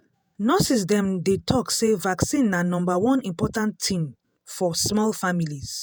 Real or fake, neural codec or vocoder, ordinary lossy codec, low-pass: fake; vocoder, 48 kHz, 128 mel bands, Vocos; none; none